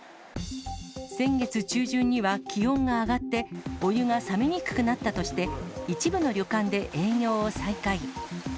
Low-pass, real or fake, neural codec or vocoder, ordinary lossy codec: none; real; none; none